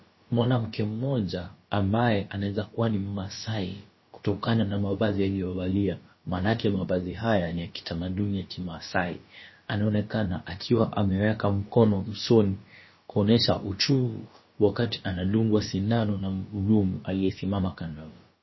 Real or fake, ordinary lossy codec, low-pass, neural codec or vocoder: fake; MP3, 24 kbps; 7.2 kHz; codec, 16 kHz, about 1 kbps, DyCAST, with the encoder's durations